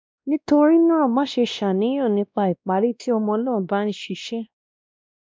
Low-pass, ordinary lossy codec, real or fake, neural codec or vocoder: none; none; fake; codec, 16 kHz, 1 kbps, X-Codec, WavLM features, trained on Multilingual LibriSpeech